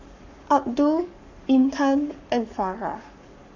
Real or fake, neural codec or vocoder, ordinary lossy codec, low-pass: fake; codec, 44.1 kHz, 7.8 kbps, Pupu-Codec; none; 7.2 kHz